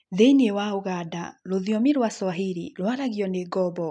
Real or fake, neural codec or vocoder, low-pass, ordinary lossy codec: real; none; 9.9 kHz; none